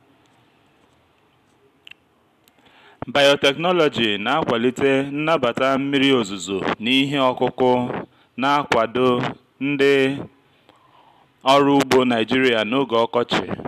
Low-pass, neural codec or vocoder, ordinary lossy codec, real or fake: 14.4 kHz; none; MP3, 96 kbps; real